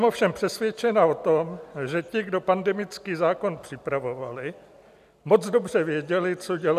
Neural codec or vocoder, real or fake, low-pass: none; real; 14.4 kHz